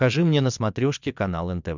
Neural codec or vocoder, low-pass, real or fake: vocoder, 22.05 kHz, 80 mel bands, WaveNeXt; 7.2 kHz; fake